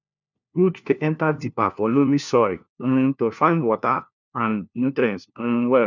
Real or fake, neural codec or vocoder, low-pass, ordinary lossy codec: fake; codec, 16 kHz, 1 kbps, FunCodec, trained on LibriTTS, 50 frames a second; 7.2 kHz; none